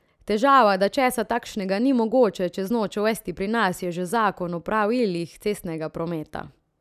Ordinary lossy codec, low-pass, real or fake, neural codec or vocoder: none; 14.4 kHz; real; none